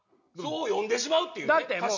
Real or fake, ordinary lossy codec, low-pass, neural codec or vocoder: real; none; 7.2 kHz; none